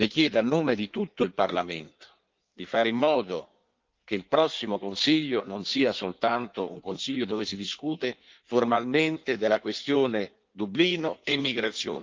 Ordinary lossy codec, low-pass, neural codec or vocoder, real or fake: Opus, 32 kbps; 7.2 kHz; codec, 16 kHz in and 24 kHz out, 1.1 kbps, FireRedTTS-2 codec; fake